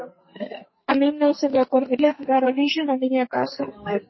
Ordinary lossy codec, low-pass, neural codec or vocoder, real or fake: MP3, 24 kbps; 7.2 kHz; codec, 44.1 kHz, 2.6 kbps, SNAC; fake